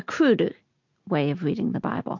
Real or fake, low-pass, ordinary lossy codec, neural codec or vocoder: real; 7.2 kHz; MP3, 48 kbps; none